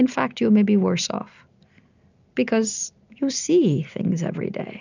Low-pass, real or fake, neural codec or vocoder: 7.2 kHz; real; none